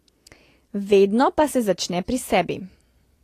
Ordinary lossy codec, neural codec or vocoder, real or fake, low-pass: AAC, 48 kbps; none; real; 14.4 kHz